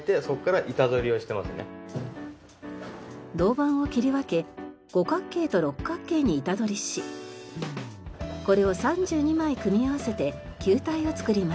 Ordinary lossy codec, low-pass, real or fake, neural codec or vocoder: none; none; real; none